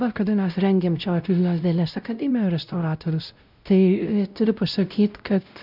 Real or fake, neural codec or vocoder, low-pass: fake; codec, 16 kHz, 0.5 kbps, X-Codec, WavLM features, trained on Multilingual LibriSpeech; 5.4 kHz